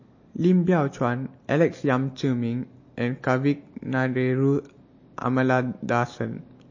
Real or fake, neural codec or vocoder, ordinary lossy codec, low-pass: real; none; MP3, 32 kbps; 7.2 kHz